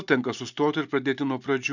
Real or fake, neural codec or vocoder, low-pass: real; none; 7.2 kHz